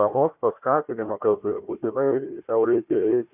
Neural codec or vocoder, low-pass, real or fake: codec, 16 kHz, 1 kbps, FunCodec, trained on Chinese and English, 50 frames a second; 3.6 kHz; fake